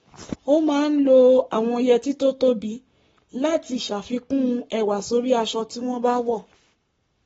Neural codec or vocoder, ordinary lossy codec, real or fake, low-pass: codec, 44.1 kHz, 7.8 kbps, Pupu-Codec; AAC, 24 kbps; fake; 19.8 kHz